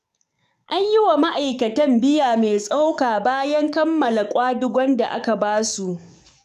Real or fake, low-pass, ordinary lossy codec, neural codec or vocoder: fake; 14.4 kHz; none; codec, 44.1 kHz, 7.8 kbps, DAC